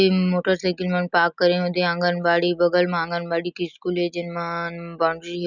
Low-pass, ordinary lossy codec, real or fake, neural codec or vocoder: 7.2 kHz; none; real; none